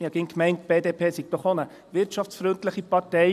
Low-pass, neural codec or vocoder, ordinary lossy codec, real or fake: 14.4 kHz; vocoder, 44.1 kHz, 128 mel bands every 256 samples, BigVGAN v2; none; fake